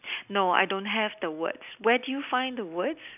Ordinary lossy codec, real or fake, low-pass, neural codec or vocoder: none; real; 3.6 kHz; none